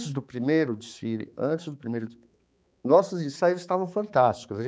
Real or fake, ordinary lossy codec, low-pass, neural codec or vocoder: fake; none; none; codec, 16 kHz, 4 kbps, X-Codec, HuBERT features, trained on balanced general audio